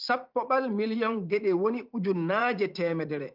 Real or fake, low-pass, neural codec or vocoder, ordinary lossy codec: fake; 5.4 kHz; vocoder, 44.1 kHz, 80 mel bands, Vocos; Opus, 32 kbps